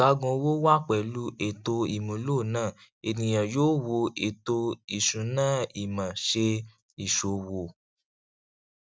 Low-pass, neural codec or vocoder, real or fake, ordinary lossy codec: none; none; real; none